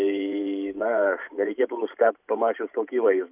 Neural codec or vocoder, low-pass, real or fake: vocoder, 44.1 kHz, 128 mel bands every 512 samples, BigVGAN v2; 3.6 kHz; fake